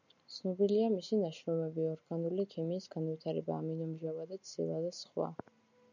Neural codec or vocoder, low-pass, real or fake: none; 7.2 kHz; real